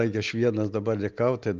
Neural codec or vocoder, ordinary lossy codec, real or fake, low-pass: none; Opus, 32 kbps; real; 7.2 kHz